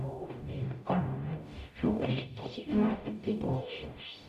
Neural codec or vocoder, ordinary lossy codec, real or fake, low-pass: codec, 44.1 kHz, 0.9 kbps, DAC; none; fake; 14.4 kHz